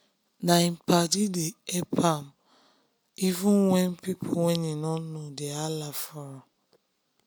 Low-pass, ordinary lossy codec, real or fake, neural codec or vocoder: none; none; real; none